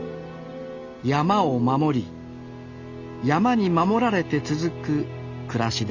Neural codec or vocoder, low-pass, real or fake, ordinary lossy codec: none; 7.2 kHz; real; none